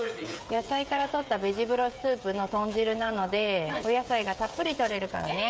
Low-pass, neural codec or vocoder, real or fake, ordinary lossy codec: none; codec, 16 kHz, 16 kbps, FreqCodec, smaller model; fake; none